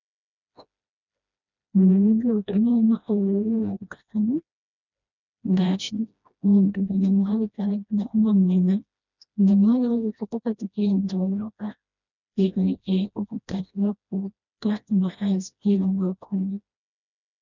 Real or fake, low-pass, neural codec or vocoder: fake; 7.2 kHz; codec, 16 kHz, 1 kbps, FreqCodec, smaller model